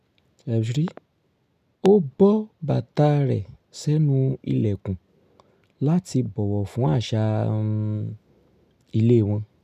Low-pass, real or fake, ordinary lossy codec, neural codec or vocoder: 14.4 kHz; real; none; none